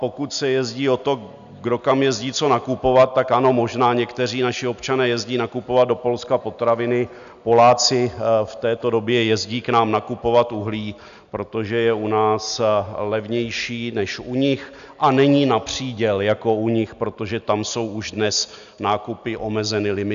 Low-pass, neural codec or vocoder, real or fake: 7.2 kHz; none; real